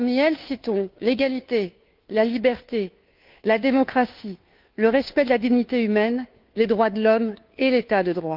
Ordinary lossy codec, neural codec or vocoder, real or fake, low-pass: Opus, 32 kbps; codec, 16 kHz, 2 kbps, FunCodec, trained on Chinese and English, 25 frames a second; fake; 5.4 kHz